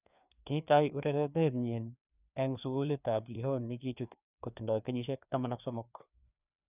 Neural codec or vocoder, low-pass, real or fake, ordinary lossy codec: codec, 16 kHz, 2 kbps, FreqCodec, larger model; 3.6 kHz; fake; none